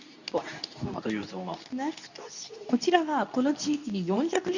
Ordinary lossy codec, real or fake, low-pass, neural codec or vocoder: none; fake; 7.2 kHz; codec, 24 kHz, 0.9 kbps, WavTokenizer, medium speech release version 2